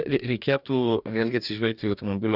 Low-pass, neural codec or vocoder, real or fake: 5.4 kHz; codec, 44.1 kHz, 2.6 kbps, DAC; fake